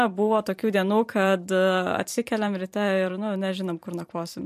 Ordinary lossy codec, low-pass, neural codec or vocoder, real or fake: MP3, 64 kbps; 14.4 kHz; vocoder, 44.1 kHz, 128 mel bands every 512 samples, BigVGAN v2; fake